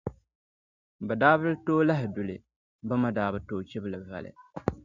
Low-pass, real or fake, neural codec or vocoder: 7.2 kHz; real; none